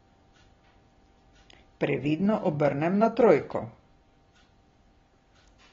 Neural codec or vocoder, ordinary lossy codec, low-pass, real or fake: none; AAC, 24 kbps; 7.2 kHz; real